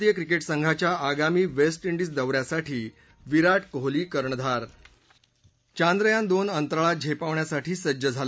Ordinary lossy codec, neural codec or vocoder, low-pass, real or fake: none; none; none; real